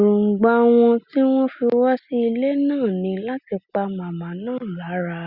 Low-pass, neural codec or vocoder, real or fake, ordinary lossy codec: 5.4 kHz; none; real; Opus, 64 kbps